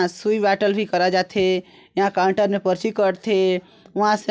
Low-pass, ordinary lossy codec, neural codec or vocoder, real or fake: none; none; none; real